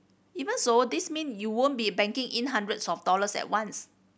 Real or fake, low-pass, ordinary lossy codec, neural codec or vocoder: real; none; none; none